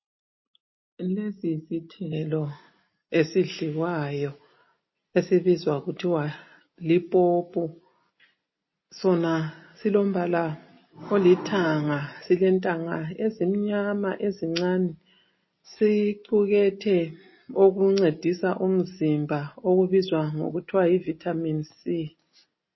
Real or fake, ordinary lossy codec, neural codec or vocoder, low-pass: real; MP3, 24 kbps; none; 7.2 kHz